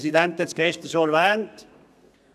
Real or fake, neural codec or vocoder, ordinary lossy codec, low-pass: fake; codec, 32 kHz, 1.9 kbps, SNAC; none; 14.4 kHz